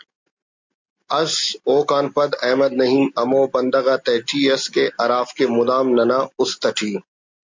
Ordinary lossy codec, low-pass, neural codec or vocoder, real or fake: MP3, 64 kbps; 7.2 kHz; none; real